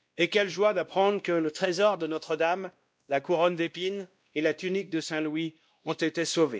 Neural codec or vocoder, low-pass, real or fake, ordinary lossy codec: codec, 16 kHz, 1 kbps, X-Codec, WavLM features, trained on Multilingual LibriSpeech; none; fake; none